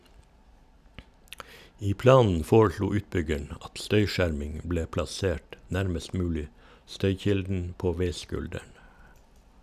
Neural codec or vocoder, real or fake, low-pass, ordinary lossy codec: none; real; 14.4 kHz; none